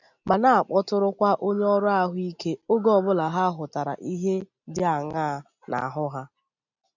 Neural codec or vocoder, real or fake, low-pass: none; real; 7.2 kHz